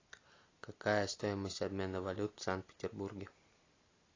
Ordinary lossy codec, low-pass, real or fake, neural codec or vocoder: AAC, 32 kbps; 7.2 kHz; real; none